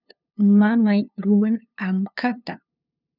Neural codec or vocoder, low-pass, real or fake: codec, 16 kHz, 2 kbps, FunCodec, trained on LibriTTS, 25 frames a second; 5.4 kHz; fake